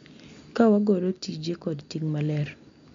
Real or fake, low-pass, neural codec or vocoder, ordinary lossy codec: real; 7.2 kHz; none; MP3, 64 kbps